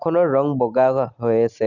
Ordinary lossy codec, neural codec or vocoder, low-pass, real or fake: none; none; 7.2 kHz; real